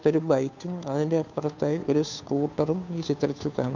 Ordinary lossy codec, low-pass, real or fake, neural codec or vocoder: none; 7.2 kHz; fake; codec, 16 kHz, 2 kbps, FunCodec, trained on Chinese and English, 25 frames a second